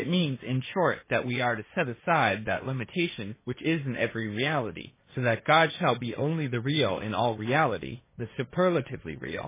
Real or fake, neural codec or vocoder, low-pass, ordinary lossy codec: fake; codec, 16 kHz, 1.1 kbps, Voila-Tokenizer; 3.6 kHz; MP3, 16 kbps